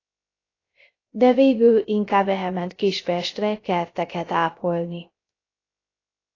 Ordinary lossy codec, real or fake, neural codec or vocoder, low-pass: AAC, 32 kbps; fake; codec, 16 kHz, 0.3 kbps, FocalCodec; 7.2 kHz